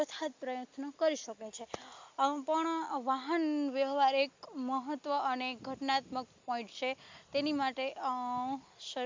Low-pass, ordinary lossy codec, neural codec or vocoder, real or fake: 7.2 kHz; MP3, 64 kbps; none; real